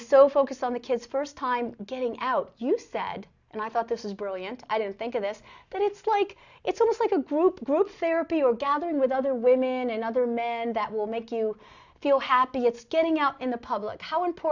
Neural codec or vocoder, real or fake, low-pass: none; real; 7.2 kHz